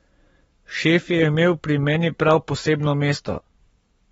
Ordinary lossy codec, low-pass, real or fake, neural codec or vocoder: AAC, 24 kbps; 19.8 kHz; fake; codec, 44.1 kHz, 7.8 kbps, Pupu-Codec